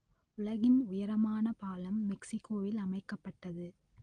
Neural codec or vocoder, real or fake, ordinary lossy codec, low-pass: none; real; Opus, 24 kbps; 9.9 kHz